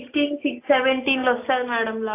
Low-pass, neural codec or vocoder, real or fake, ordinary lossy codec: 3.6 kHz; none; real; AAC, 24 kbps